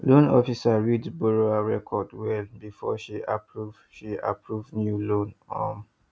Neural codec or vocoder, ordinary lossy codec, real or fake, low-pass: none; none; real; none